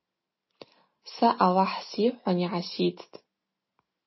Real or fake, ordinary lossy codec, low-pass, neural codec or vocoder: real; MP3, 24 kbps; 7.2 kHz; none